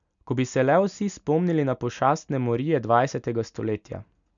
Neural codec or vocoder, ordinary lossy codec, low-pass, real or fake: none; none; 7.2 kHz; real